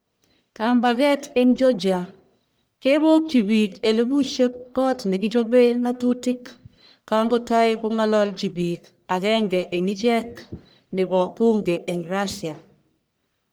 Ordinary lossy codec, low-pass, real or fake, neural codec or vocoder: none; none; fake; codec, 44.1 kHz, 1.7 kbps, Pupu-Codec